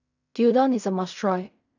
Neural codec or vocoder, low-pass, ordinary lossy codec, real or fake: codec, 16 kHz in and 24 kHz out, 0.4 kbps, LongCat-Audio-Codec, fine tuned four codebook decoder; 7.2 kHz; none; fake